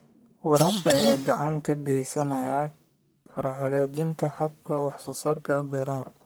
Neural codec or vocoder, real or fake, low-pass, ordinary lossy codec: codec, 44.1 kHz, 1.7 kbps, Pupu-Codec; fake; none; none